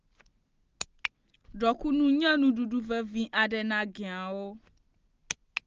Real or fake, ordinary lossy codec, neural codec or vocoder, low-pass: real; Opus, 16 kbps; none; 7.2 kHz